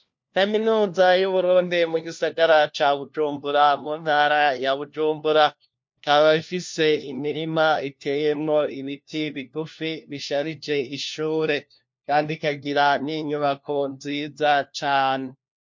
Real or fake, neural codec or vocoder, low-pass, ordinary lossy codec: fake; codec, 16 kHz, 1 kbps, FunCodec, trained on LibriTTS, 50 frames a second; 7.2 kHz; MP3, 48 kbps